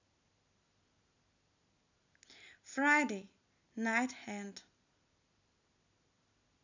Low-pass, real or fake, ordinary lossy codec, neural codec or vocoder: 7.2 kHz; real; none; none